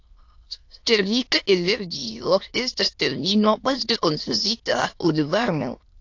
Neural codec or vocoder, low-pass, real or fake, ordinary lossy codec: autoencoder, 22.05 kHz, a latent of 192 numbers a frame, VITS, trained on many speakers; 7.2 kHz; fake; AAC, 48 kbps